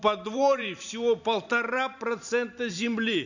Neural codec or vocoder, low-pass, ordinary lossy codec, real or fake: vocoder, 44.1 kHz, 128 mel bands every 512 samples, BigVGAN v2; 7.2 kHz; none; fake